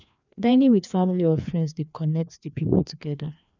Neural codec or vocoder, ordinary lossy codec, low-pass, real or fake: codec, 16 kHz, 2 kbps, FreqCodec, larger model; none; 7.2 kHz; fake